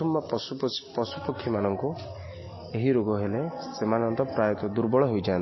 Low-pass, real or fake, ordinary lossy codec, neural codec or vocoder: 7.2 kHz; real; MP3, 24 kbps; none